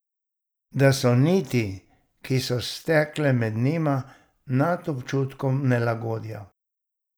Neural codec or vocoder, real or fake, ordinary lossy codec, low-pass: none; real; none; none